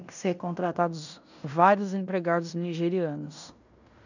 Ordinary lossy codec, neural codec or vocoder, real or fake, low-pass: none; codec, 16 kHz in and 24 kHz out, 0.9 kbps, LongCat-Audio-Codec, fine tuned four codebook decoder; fake; 7.2 kHz